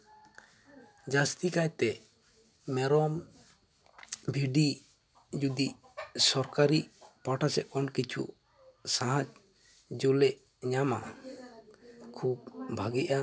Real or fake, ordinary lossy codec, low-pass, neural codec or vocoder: real; none; none; none